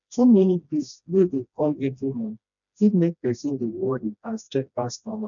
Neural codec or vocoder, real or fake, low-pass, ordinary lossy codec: codec, 16 kHz, 1 kbps, FreqCodec, smaller model; fake; 7.2 kHz; none